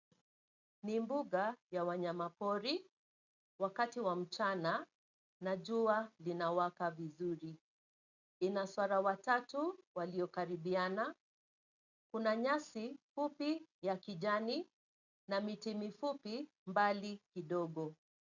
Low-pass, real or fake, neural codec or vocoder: 7.2 kHz; real; none